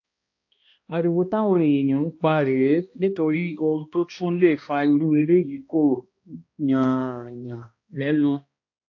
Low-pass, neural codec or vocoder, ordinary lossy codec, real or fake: 7.2 kHz; codec, 16 kHz, 1 kbps, X-Codec, HuBERT features, trained on balanced general audio; AAC, 48 kbps; fake